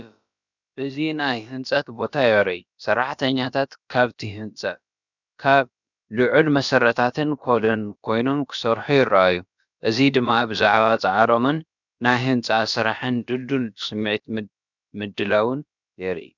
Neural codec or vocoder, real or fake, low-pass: codec, 16 kHz, about 1 kbps, DyCAST, with the encoder's durations; fake; 7.2 kHz